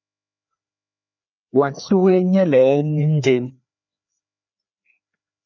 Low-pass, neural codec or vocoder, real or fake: 7.2 kHz; codec, 16 kHz, 2 kbps, FreqCodec, larger model; fake